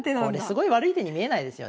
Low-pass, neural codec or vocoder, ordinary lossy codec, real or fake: none; none; none; real